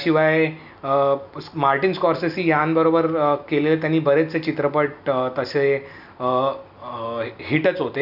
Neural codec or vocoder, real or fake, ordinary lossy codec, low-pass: none; real; none; 5.4 kHz